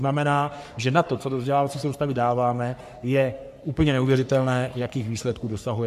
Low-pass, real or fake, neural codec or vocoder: 14.4 kHz; fake; codec, 44.1 kHz, 3.4 kbps, Pupu-Codec